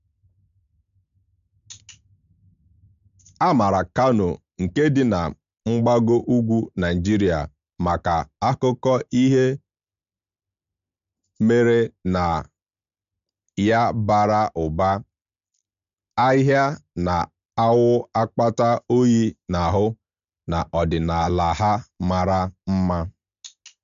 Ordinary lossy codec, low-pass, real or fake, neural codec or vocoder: MP3, 64 kbps; 7.2 kHz; real; none